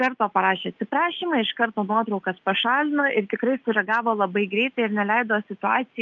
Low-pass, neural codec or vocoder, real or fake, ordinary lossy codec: 7.2 kHz; none; real; Opus, 24 kbps